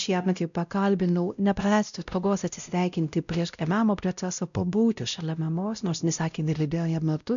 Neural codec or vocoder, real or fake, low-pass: codec, 16 kHz, 0.5 kbps, X-Codec, WavLM features, trained on Multilingual LibriSpeech; fake; 7.2 kHz